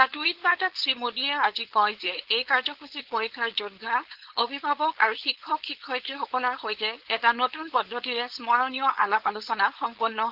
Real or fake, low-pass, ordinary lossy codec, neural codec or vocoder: fake; 5.4 kHz; Opus, 16 kbps; codec, 16 kHz, 4.8 kbps, FACodec